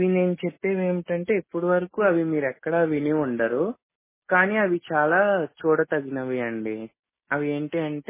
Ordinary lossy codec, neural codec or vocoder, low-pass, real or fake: MP3, 16 kbps; none; 3.6 kHz; real